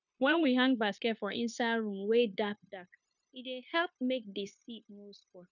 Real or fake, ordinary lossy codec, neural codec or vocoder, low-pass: fake; none; codec, 16 kHz, 0.9 kbps, LongCat-Audio-Codec; 7.2 kHz